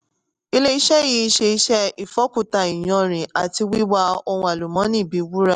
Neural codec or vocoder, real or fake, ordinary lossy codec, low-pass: none; real; none; 10.8 kHz